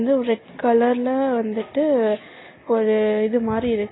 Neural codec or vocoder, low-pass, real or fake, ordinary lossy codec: none; 7.2 kHz; real; AAC, 16 kbps